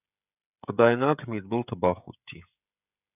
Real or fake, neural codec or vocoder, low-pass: fake; codec, 16 kHz, 16 kbps, FreqCodec, smaller model; 3.6 kHz